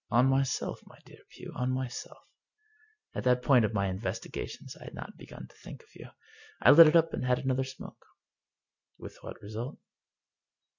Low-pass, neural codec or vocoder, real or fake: 7.2 kHz; none; real